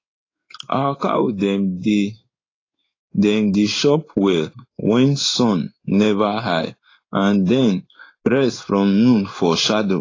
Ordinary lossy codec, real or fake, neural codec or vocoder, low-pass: AAC, 32 kbps; fake; codec, 16 kHz in and 24 kHz out, 1 kbps, XY-Tokenizer; 7.2 kHz